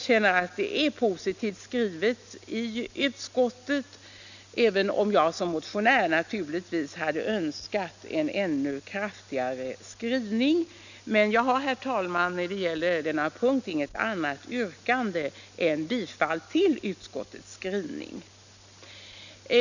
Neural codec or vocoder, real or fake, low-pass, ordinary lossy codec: none; real; 7.2 kHz; none